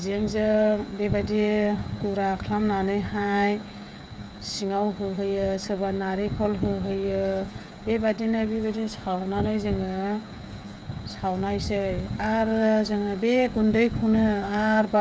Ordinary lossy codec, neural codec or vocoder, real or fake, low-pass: none; codec, 16 kHz, 16 kbps, FreqCodec, smaller model; fake; none